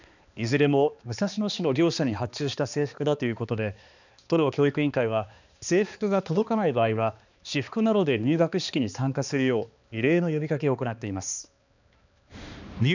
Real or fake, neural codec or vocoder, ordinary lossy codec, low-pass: fake; codec, 16 kHz, 2 kbps, X-Codec, HuBERT features, trained on balanced general audio; none; 7.2 kHz